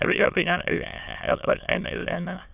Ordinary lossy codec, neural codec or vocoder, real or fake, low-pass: none; autoencoder, 22.05 kHz, a latent of 192 numbers a frame, VITS, trained on many speakers; fake; 3.6 kHz